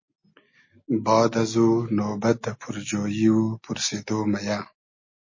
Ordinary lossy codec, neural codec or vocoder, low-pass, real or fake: MP3, 32 kbps; none; 7.2 kHz; real